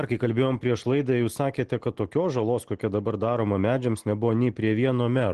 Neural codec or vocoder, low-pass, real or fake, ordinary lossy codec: none; 10.8 kHz; real; Opus, 16 kbps